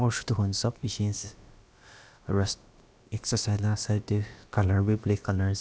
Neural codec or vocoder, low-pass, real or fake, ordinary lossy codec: codec, 16 kHz, about 1 kbps, DyCAST, with the encoder's durations; none; fake; none